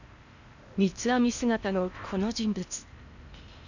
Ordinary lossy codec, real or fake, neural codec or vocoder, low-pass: none; fake; codec, 16 kHz in and 24 kHz out, 0.8 kbps, FocalCodec, streaming, 65536 codes; 7.2 kHz